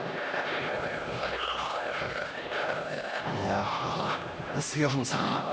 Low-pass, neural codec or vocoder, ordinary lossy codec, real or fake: none; codec, 16 kHz, 1 kbps, X-Codec, HuBERT features, trained on LibriSpeech; none; fake